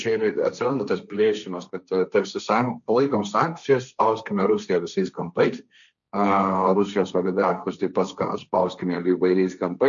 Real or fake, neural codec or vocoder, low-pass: fake; codec, 16 kHz, 1.1 kbps, Voila-Tokenizer; 7.2 kHz